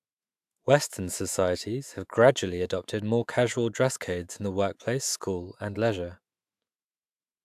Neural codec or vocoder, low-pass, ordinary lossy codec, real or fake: autoencoder, 48 kHz, 128 numbers a frame, DAC-VAE, trained on Japanese speech; 14.4 kHz; AAC, 96 kbps; fake